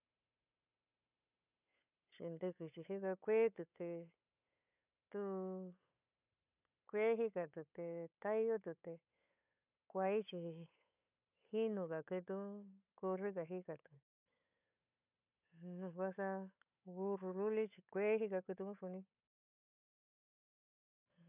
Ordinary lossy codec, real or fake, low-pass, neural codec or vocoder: none; fake; 3.6 kHz; codec, 16 kHz, 8 kbps, FunCodec, trained on Chinese and English, 25 frames a second